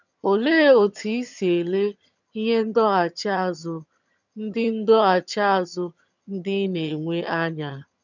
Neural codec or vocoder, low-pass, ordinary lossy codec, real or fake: vocoder, 22.05 kHz, 80 mel bands, HiFi-GAN; 7.2 kHz; none; fake